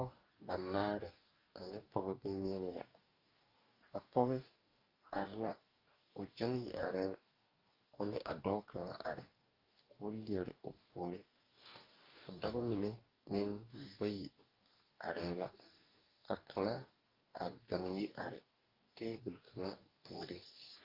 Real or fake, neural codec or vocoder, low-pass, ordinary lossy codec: fake; codec, 44.1 kHz, 2.6 kbps, DAC; 5.4 kHz; Opus, 32 kbps